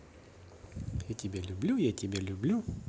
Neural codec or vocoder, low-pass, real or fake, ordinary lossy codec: none; none; real; none